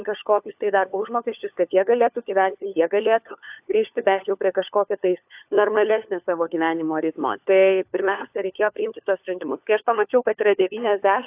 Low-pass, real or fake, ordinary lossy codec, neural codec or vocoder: 3.6 kHz; fake; AAC, 32 kbps; codec, 16 kHz, 4 kbps, FunCodec, trained on LibriTTS, 50 frames a second